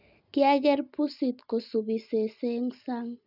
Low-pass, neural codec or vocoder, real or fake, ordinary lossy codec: 5.4 kHz; none; real; none